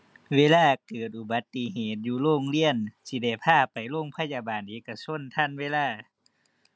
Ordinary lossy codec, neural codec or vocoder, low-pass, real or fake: none; none; none; real